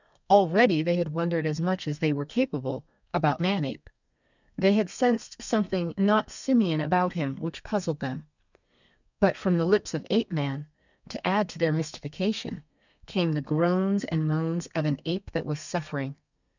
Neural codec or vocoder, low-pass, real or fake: codec, 32 kHz, 1.9 kbps, SNAC; 7.2 kHz; fake